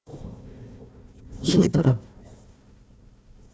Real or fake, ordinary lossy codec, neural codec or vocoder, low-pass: fake; none; codec, 16 kHz, 1 kbps, FunCodec, trained on Chinese and English, 50 frames a second; none